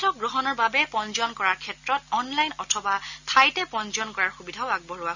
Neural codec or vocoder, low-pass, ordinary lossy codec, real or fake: none; 7.2 kHz; none; real